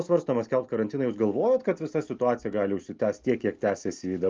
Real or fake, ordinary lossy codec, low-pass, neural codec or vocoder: real; Opus, 24 kbps; 7.2 kHz; none